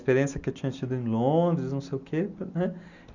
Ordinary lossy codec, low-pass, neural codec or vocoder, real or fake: none; 7.2 kHz; none; real